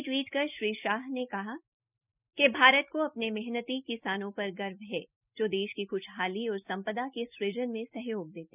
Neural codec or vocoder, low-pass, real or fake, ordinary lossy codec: none; 3.6 kHz; real; none